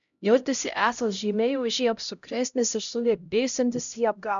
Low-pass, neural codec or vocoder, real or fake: 7.2 kHz; codec, 16 kHz, 0.5 kbps, X-Codec, HuBERT features, trained on LibriSpeech; fake